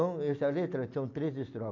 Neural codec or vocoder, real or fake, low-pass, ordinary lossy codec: none; real; 7.2 kHz; MP3, 48 kbps